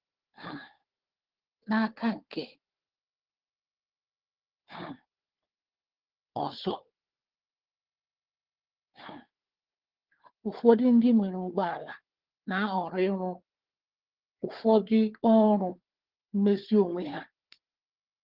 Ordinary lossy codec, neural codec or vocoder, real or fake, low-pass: Opus, 16 kbps; codec, 16 kHz, 4 kbps, FunCodec, trained on Chinese and English, 50 frames a second; fake; 5.4 kHz